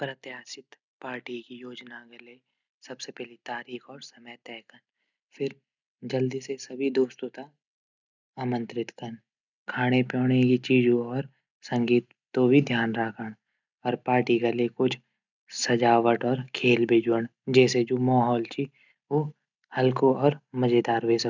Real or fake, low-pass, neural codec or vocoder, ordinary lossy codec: real; 7.2 kHz; none; none